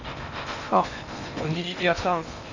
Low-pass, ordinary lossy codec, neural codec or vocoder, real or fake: 7.2 kHz; none; codec, 16 kHz in and 24 kHz out, 0.8 kbps, FocalCodec, streaming, 65536 codes; fake